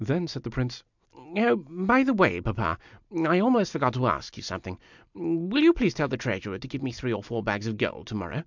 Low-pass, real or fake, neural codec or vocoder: 7.2 kHz; real; none